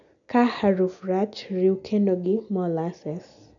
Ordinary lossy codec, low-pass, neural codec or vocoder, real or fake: none; 7.2 kHz; none; real